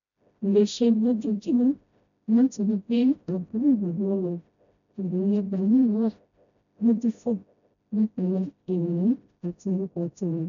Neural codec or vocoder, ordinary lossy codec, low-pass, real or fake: codec, 16 kHz, 0.5 kbps, FreqCodec, smaller model; none; 7.2 kHz; fake